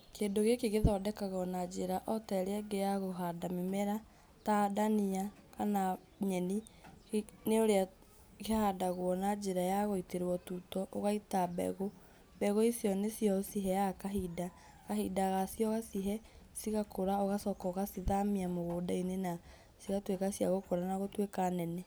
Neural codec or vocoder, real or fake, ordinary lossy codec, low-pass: none; real; none; none